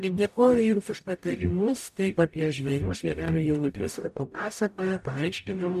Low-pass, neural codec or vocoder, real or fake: 14.4 kHz; codec, 44.1 kHz, 0.9 kbps, DAC; fake